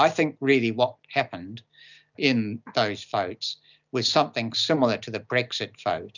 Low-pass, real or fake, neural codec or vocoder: 7.2 kHz; real; none